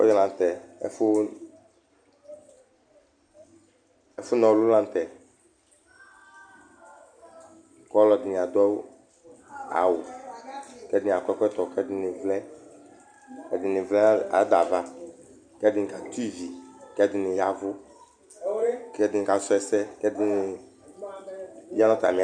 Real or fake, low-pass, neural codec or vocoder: real; 9.9 kHz; none